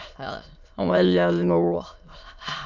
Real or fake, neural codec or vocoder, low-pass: fake; autoencoder, 22.05 kHz, a latent of 192 numbers a frame, VITS, trained on many speakers; 7.2 kHz